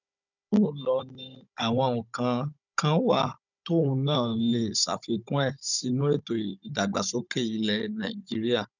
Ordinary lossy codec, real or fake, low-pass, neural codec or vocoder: none; fake; 7.2 kHz; codec, 16 kHz, 16 kbps, FunCodec, trained on Chinese and English, 50 frames a second